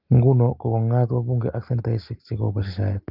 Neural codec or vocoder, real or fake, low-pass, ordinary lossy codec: none; real; 5.4 kHz; Opus, 32 kbps